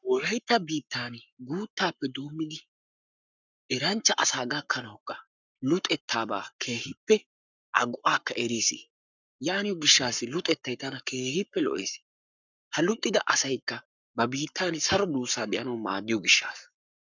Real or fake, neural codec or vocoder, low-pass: fake; codec, 44.1 kHz, 7.8 kbps, Pupu-Codec; 7.2 kHz